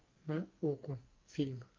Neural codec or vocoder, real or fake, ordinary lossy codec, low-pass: codec, 44.1 kHz, 2.6 kbps, SNAC; fake; Opus, 24 kbps; 7.2 kHz